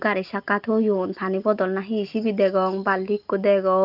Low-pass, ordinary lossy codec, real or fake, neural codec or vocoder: 5.4 kHz; Opus, 24 kbps; real; none